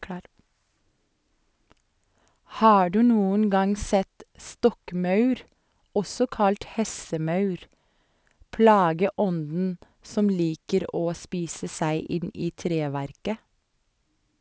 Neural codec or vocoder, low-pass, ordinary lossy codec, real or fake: none; none; none; real